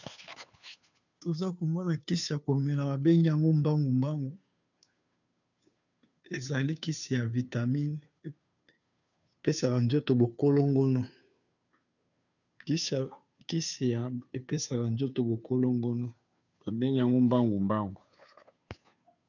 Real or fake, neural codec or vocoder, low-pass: fake; codec, 16 kHz, 2 kbps, FunCodec, trained on Chinese and English, 25 frames a second; 7.2 kHz